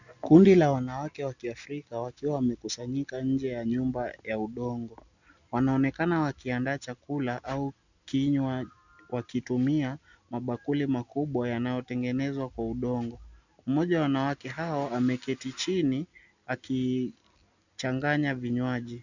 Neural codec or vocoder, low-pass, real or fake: none; 7.2 kHz; real